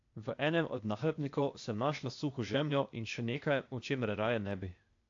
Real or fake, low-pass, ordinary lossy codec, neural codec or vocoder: fake; 7.2 kHz; AAC, 48 kbps; codec, 16 kHz, 0.8 kbps, ZipCodec